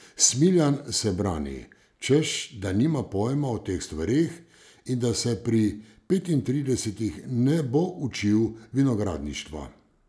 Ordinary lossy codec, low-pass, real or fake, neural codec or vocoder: none; none; real; none